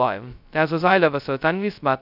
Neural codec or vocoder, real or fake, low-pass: codec, 16 kHz, 0.2 kbps, FocalCodec; fake; 5.4 kHz